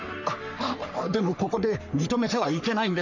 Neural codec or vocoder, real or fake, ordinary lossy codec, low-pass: codec, 44.1 kHz, 3.4 kbps, Pupu-Codec; fake; none; 7.2 kHz